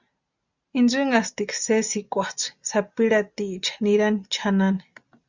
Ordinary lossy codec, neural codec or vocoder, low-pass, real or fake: Opus, 64 kbps; none; 7.2 kHz; real